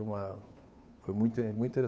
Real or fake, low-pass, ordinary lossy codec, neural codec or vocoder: fake; none; none; codec, 16 kHz, 2 kbps, FunCodec, trained on Chinese and English, 25 frames a second